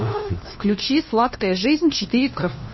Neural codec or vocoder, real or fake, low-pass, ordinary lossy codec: codec, 16 kHz, 1 kbps, FunCodec, trained on LibriTTS, 50 frames a second; fake; 7.2 kHz; MP3, 24 kbps